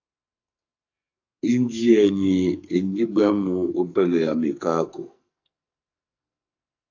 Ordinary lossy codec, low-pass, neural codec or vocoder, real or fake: AAC, 48 kbps; 7.2 kHz; codec, 44.1 kHz, 2.6 kbps, SNAC; fake